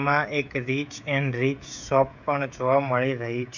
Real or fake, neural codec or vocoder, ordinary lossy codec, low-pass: fake; codec, 16 kHz, 16 kbps, FreqCodec, smaller model; none; 7.2 kHz